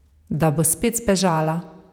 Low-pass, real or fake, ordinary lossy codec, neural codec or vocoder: 19.8 kHz; fake; none; vocoder, 48 kHz, 128 mel bands, Vocos